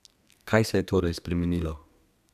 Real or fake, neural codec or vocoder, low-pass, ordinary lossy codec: fake; codec, 32 kHz, 1.9 kbps, SNAC; 14.4 kHz; none